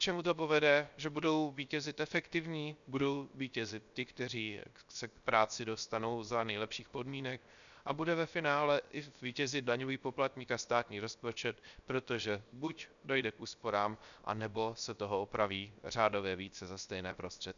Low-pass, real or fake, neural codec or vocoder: 7.2 kHz; fake; codec, 16 kHz, 0.7 kbps, FocalCodec